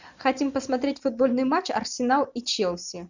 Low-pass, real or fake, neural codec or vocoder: 7.2 kHz; real; none